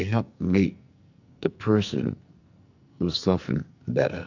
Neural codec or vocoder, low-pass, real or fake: codec, 44.1 kHz, 2.6 kbps, SNAC; 7.2 kHz; fake